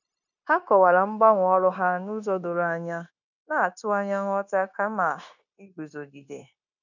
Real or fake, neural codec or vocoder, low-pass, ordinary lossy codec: fake; codec, 16 kHz, 0.9 kbps, LongCat-Audio-Codec; 7.2 kHz; none